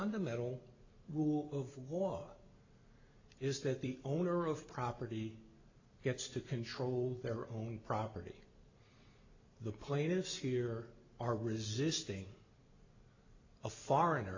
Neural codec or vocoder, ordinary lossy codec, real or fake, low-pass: none; AAC, 48 kbps; real; 7.2 kHz